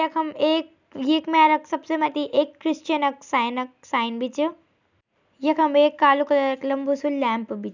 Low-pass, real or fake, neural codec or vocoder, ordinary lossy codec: 7.2 kHz; real; none; none